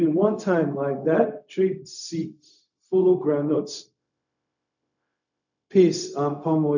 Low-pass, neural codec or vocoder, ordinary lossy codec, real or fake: 7.2 kHz; codec, 16 kHz, 0.4 kbps, LongCat-Audio-Codec; none; fake